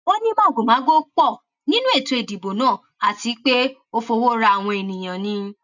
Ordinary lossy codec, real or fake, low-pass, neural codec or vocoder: none; real; 7.2 kHz; none